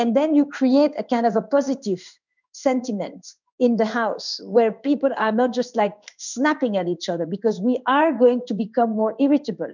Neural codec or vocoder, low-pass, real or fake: codec, 16 kHz in and 24 kHz out, 1 kbps, XY-Tokenizer; 7.2 kHz; fake